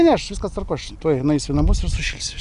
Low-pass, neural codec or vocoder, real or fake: 10.8 kHz; none; real